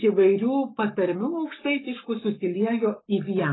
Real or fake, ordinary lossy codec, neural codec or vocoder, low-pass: fake; AAC, 16 kbps; codec, 44.1 kHz, 7.8 kbps, Pupu-Codec; 7.2 kHz